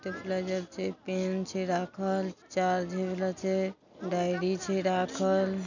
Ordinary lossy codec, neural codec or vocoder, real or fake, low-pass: none; none; real; 7.2 kHz